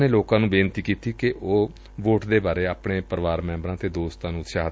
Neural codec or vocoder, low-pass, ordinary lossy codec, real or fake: none; none; none; real